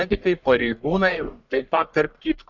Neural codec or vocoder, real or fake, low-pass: codec, 44.1 kHz, 1.7 kbps, Pupu-Codec; fake; 7.2 kHz